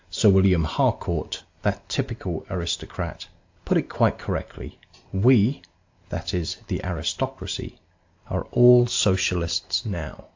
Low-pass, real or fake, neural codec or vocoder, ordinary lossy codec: 7.2 kHz; real; none; AAC, 48 kbps